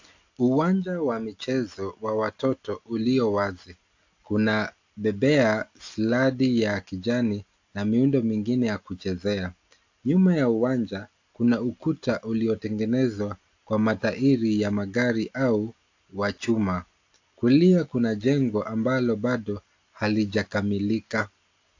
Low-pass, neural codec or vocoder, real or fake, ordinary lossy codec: 7.2 kHz; none; real; AAC, 48 kbps